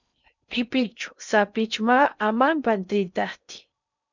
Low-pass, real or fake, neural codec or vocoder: 7.2 kHz; fake; codec, 16 kHz in and 24 kHz out, 0.6 kbps, FocalCodec, streaming, 4096 codes